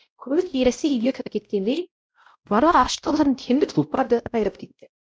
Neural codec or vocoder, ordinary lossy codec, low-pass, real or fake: codec, 16 kHz, 0.5 kbps, X-Codec, WavLM features, trained on Multilingual LibriSpeech; none; none; fake